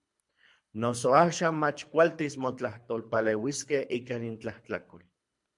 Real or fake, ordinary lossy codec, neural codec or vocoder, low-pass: fake; MP3, 64 kbps; codec, 24 kHz, 3 kbps, HILCodec; 10.8 kHz